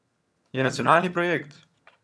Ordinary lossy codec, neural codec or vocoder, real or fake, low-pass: none; vocoder, 22.05 kHz, 80 mel bands, HiFi-GAN; fake; none